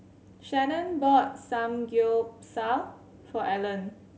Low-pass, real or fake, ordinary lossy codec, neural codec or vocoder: none; real; none; none